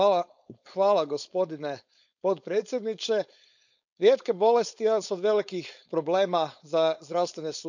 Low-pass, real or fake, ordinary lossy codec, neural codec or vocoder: 7.2 kHz; fake; none; codec, 16 kHz, 4.8 kbps, FACodec